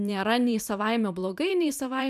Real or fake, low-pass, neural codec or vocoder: fake; 14.4 kHz; vocoder, 44.1 kHz, 128 mel bands every 512 samples, BigVGAN v2